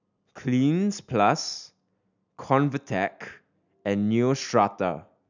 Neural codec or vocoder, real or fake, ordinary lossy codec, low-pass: none; real; none; 7.2 kHz